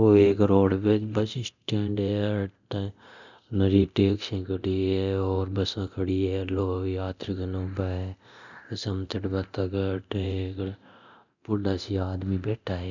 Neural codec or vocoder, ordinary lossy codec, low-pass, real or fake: codec, 24 kHz, 0.9 kbps, DualCodec; none; 7.2 kHz; fake